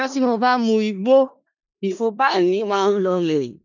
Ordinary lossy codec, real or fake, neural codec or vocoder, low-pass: none; fake; codec, 16 kHz in and 24 kHz out, 0.4 kbps, LongCat-Audio-Codec, four codebook decoder; 7.2 kHz